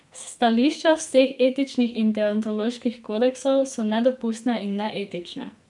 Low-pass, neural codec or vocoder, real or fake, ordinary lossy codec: 10.8 kHz; codec, 44.1 kHz, 2.6 kbps, SNAC; fake; MP3, 96 kbps